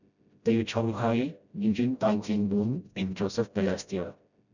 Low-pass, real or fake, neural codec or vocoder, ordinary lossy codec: 7.2 kHz; fake; codec, 16 kHz, 0.5 kbps, FreqCodec, smaller model; none